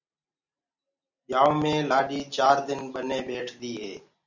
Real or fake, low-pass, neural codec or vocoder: real; 7.2 kHz; none